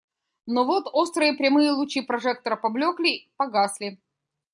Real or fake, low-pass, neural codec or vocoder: real; 10.8 kHz; none